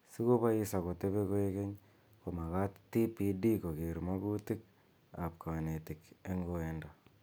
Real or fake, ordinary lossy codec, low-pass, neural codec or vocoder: real; none; none; none